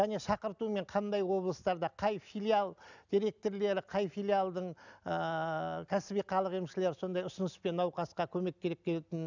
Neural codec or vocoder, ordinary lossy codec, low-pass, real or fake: none; none; 7.2 kHz; real